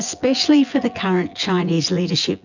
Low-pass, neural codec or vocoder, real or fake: 7.2 kHz; vocoder, 24 kHz, 100 mel bands, Vocos; fake